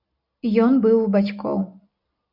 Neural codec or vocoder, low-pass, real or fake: none; 5.4 kHz; real